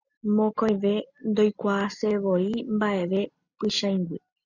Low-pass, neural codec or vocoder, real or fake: 7.2 kHz; none; real